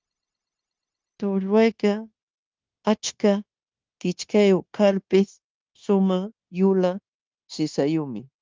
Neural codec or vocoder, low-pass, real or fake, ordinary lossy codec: codec, 16 kHz, 0.9 kbps, LongCat-Audio-Codec; 7.2 kHz; fake; Opus, 32 kbps